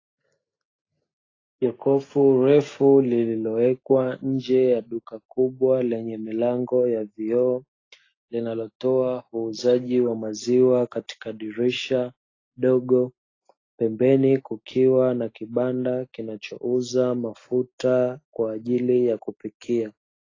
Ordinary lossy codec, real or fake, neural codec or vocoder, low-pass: AAC, 32 kbps; real; none; 7.2 kHz